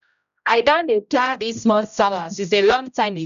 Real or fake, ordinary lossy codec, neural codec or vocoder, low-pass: fake; none; codec, 16 kHz, 0.5 kbps, X-Codec, HuBERT features, trained on general audio; 7.2 kHz